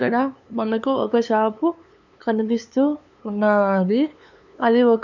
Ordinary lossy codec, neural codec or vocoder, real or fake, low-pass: none; codec, 16 kHz, 2 kbps, FunCodec, trained on LibriTTS, 25 frames a second; fake; 7.2 kHz